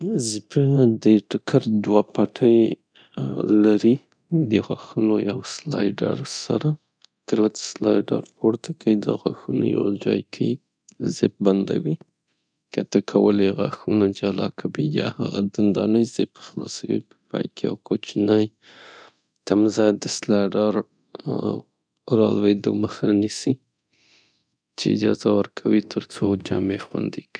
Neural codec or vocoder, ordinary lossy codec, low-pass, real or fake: codec, 24 kHz, 0.9 kbps, DualCodec; none; 9.9 kHz; fake